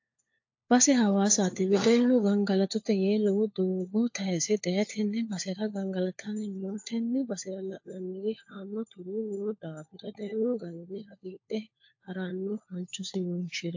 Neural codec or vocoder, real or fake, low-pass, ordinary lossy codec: codec, 16 kHz, 4 kbps, FunCodec, trained on LibriTTS, 50 frames a second; fake; 7.2 kHz; AAC, 48 kbps